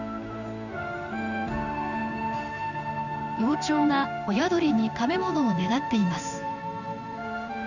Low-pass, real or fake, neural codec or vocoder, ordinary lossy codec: 7.2 kHz; fake; codec, 16 kHz in and 24 kHz out, 1 kbps, XY-Tokenizer; none